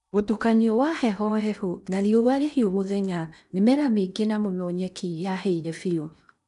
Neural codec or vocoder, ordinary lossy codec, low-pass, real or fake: codec, 16 kHz in and 24 kHz out, 0.8 kbps, FocalCodec, streaming, 65536 codes; none; 10.8 kHz; fake